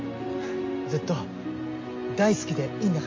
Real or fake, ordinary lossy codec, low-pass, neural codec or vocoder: real; MP3, 32 kbps; 7.2 kHz; none